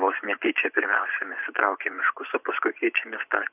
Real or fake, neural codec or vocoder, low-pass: fake; vocoder, 24 kHz, 100 mel bands, Vocos; 3.6 kHz